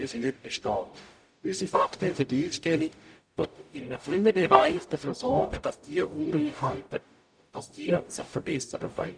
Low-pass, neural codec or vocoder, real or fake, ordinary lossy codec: 9.9 kHz; codec, 44.1 kHz, 0.9 kbps, DAC; fake; none